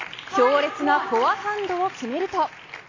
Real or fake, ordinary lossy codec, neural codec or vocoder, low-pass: real; AAC, 32 kbps; none; 7.2 kHz